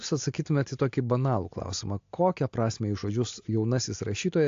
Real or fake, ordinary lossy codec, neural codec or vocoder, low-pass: real; AAC, 48 kbps; none; 7.2 kHz